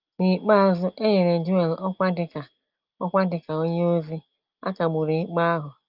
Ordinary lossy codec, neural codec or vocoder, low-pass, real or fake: Opus, 32 kbps; none; 5.4 kHz; real